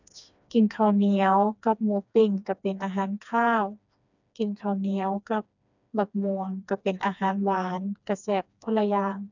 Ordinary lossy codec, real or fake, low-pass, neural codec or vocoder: none; fake; 7.2 kHz; codec, 16 kHz, 2 kbps, FreqCodec, smaller model